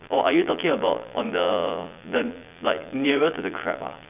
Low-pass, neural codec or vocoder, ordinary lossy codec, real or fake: 3.6 kHz; vocoder, 22.05 kHz, 80 mel bands, Vocos; none; fake